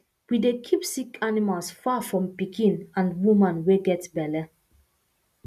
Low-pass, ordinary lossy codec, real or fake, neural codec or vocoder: 14.4 kHz; none; real; none